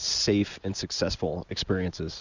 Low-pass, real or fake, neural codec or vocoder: 7.2 kHz; real; none